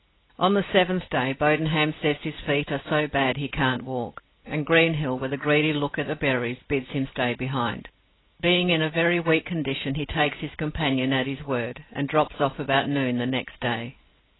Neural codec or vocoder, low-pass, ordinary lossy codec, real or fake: none; 7.2 kHz; AAC, 16 kbps; real